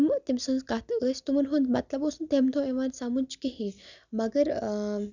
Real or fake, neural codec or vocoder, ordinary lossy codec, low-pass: real; none; none; 7.2 kHz